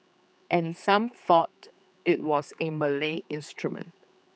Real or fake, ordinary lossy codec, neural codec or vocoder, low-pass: fake; none; codec, 16 kHz, 4 kbps, X-Codec, HuBERT features, trained on general audio; none